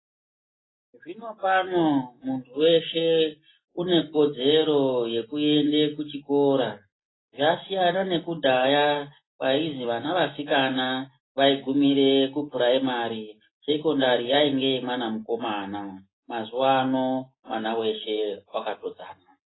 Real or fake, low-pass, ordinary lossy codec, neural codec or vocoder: real; 7.2 kHz; AAC, 16 kbps; none